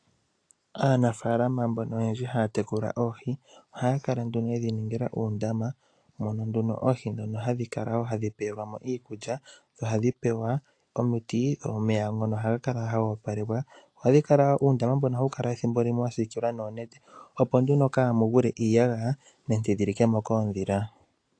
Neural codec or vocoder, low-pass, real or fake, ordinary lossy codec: none; 9.9 kHz; real; AAC, 48 kbps